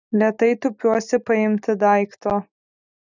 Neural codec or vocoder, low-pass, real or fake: none; 7.2 kHz; real